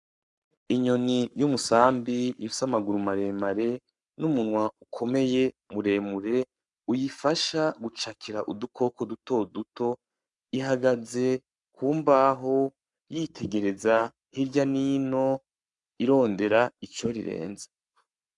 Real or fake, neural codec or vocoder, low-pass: fake; codec, 44.1 kHz, 7.8 kbps, Pupu-Codec; 10.8 kHz